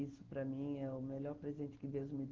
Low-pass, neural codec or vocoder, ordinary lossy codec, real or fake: 7.2 kHz; none; Opus, 16 kbps; real